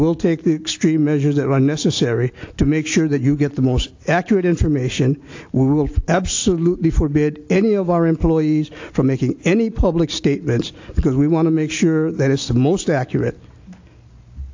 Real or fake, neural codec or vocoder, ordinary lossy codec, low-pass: real; none; AAC, 48 kbps; 7.2 kHz